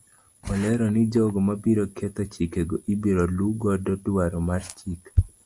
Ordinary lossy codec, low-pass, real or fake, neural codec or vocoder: Opus, 64 kbps; 10.8 kHz; real; none